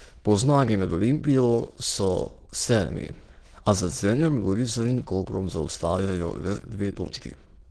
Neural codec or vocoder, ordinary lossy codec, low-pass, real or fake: autoencoder, 22.05 kHz, a latent of 192 numbers a frame, VITS, trained on many speakers; Opus, 16 kbps; 9.9 kHz; fake